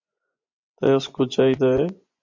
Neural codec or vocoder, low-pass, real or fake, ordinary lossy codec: vocoder, 24 kHz, 100 mel bands, Vocos; 7.2 kHz; fake; MP3, 64 kbps